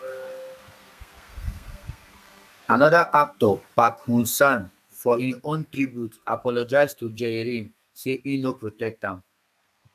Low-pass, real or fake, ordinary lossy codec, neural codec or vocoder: 14.4 kHz; fake; none; codec, 32 kHz, 1.9 kbps, SNAC